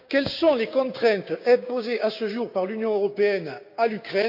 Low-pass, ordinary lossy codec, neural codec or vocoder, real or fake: 5.4 kHz; none; codec, 16 kHz, 6 kbps, DAC; fake